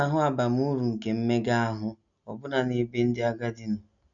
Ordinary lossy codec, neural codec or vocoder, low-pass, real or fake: none; none; 7.2 kHz; real